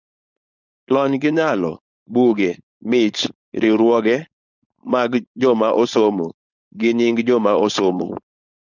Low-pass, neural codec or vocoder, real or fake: 7.2 kHz; codec, 16 kHz, 4.8 kbps, FACodec; fake